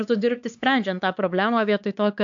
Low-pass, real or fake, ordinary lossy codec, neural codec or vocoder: 7.2 kHz; fake; AAC, 64 kbps; codec, 16 kHz, 4 kbps, X-Codec, HuBERT features, trained on LibriSpeech